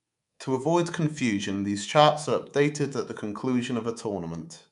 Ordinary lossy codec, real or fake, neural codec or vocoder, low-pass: none; fake; codec, 24 kHz, 3.1 kbps, DualCodec; 10.8 kHz